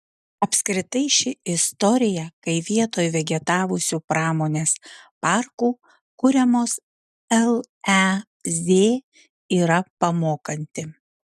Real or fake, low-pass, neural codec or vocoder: real; 14.4 kHz; none